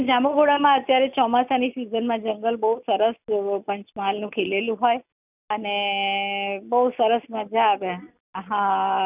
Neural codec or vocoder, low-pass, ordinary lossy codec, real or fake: none; 3.6 kHz; none; real